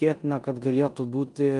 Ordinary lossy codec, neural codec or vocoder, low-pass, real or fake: Opus, 32 kbps; codec, 16 kHz in and 24 kHz out, 0.9 kbps, LongCat-Audio-Codec, four codebook decoder; 10.8 kHz; fake